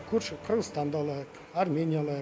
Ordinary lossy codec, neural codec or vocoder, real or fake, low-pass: none; none; real; none